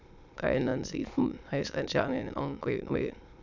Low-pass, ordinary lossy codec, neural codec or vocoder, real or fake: 7.2 kHz; none; autoencoder, 22.05 kHz, a latent of 192 numbers a frame, VITS, trained on many speakers; fake